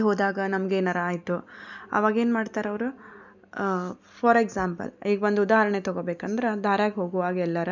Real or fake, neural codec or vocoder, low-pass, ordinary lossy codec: real; none; 7.2 kHz; none